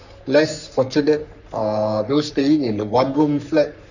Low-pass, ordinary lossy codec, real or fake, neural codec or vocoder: 7.2 kHz; none; fake; codec, 44.1 kHz, 3.4 kbps, Pupu-Codec